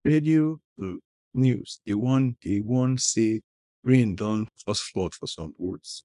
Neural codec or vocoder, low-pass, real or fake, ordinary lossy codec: codec, 24 kHz, 0.9 kbps, WavTokenizer, small release; 10.8 kHz; fake; none